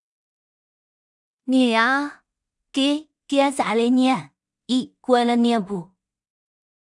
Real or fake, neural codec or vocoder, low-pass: fake; codec, 16 kHz in and 24 kHz out, 0.4 kbps, LongCat-Audio-Codec, two codebook decoder; 10.8 kHz